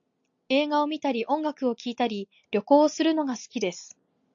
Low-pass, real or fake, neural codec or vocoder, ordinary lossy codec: 7.2 kHz; real; none; AAC, 64 kbps